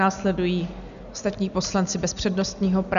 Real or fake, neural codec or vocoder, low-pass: real; none; 7.2 kHz